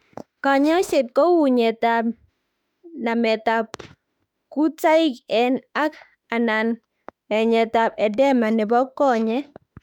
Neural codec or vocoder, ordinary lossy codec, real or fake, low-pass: autoencoder, 48 kHz, 32 numbers a frame, DAC-VAE, trained on Japanese speech; none; fake; 19.8 kHz